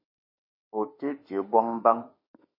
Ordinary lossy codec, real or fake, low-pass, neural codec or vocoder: MP3, 24 kbps; fake; 5.4 kHz; autoencoder, 48 kHz, 32 numbers a frame, DAC-VAE, trained on Japanese speech